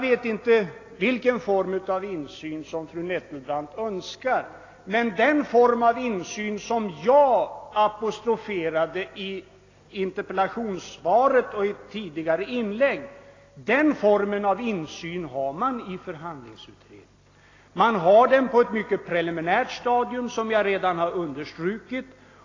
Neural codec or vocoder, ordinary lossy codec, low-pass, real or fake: none; AAC, 32 kbps; 7.2 kHz; real